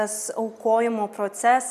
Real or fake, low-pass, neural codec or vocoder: real; 14.4 kHz; none